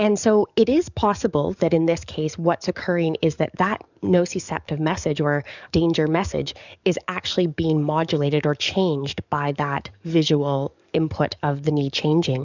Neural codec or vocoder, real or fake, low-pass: codec, 44.1 kHz, 7.8 kbps, DAC; fake; 7.2 kHz